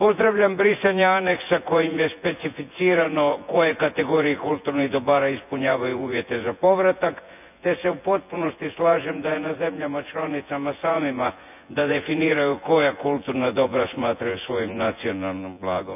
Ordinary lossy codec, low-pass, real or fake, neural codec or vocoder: none; 3.6 kHz; fake; vocoder, 24 kHz, 100 mel bands, Vocos